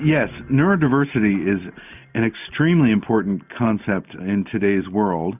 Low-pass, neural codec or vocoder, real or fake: 3.6 kHz; none; real